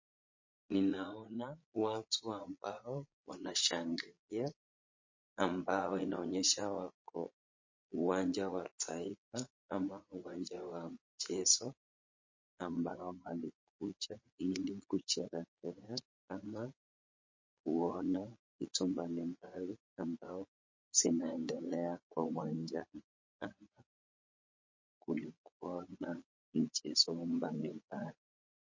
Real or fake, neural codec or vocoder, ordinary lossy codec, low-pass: fake; vocoder, 22.05 kHz, 80 mel bands, Vocos; MP3, 32 kbps; 7.2 kHz